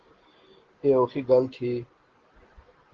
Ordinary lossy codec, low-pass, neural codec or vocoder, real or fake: Opus, 16 kbps; 7.2 kHz; none; real